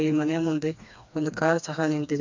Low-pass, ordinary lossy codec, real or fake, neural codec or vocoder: 7.2 kHz; MP3, 64 kbps; fake; codec, 16 kHz, 2 kbps, FreqCodec, smaller model